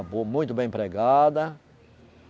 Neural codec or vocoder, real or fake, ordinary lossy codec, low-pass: none; real; none; none